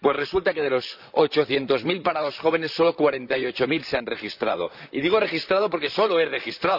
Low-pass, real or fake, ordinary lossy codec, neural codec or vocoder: 5.4 kHz; fake; none; vocoder, 44.1 kHz, 128 mel bands, Pupu-Vocoder